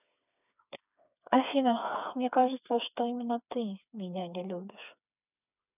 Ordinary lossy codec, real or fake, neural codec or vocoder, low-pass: none; fake; codec, 16 kHz, 4 kbps, FreqCodec, smaller model; 3.6 kHz